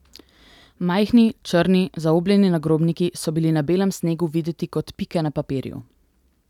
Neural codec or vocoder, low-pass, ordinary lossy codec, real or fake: none; 19.8 kHz; none; real